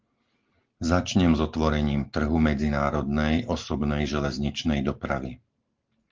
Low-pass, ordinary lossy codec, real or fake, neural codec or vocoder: 7.2 kHz; Opus, 16 kbps; real; none